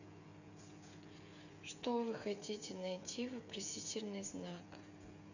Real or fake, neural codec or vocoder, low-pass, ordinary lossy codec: fake; codec, 16 kHz, 8 kbps, FreqCodec, smaller model; 7.2 kHz; none